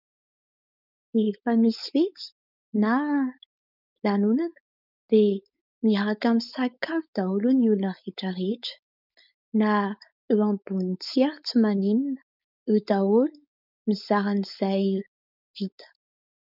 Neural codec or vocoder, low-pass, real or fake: codec, 16 kHz, 4.8 kbps, FACodec; 5.4 kHz; fake